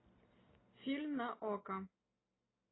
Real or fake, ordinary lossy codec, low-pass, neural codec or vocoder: real; AAC, 16 kbps; 7.2 kHz; none